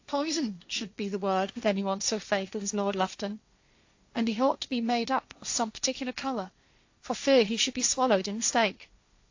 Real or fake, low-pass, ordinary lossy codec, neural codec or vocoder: fake; 7.2 kHz; AAC, 48 kbps; codec, 16 kHz, 1.1 kbps, Voila-Tokenizer